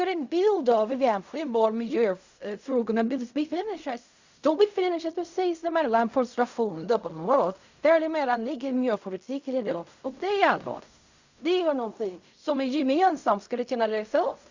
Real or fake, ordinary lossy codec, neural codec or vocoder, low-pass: fake; Opus, 64 kbps; codec, 16 kHz in and 24 kHz out, 0.4 kbps, LongCat-Audio-Codec, fine tuned four codebook decoder; 7.2 kHz